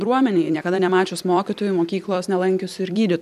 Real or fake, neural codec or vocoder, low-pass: fake; vocoder, 44.1 kHz, 128 mel bands every 256 samples, BigVGAN v2; 14.4 kHz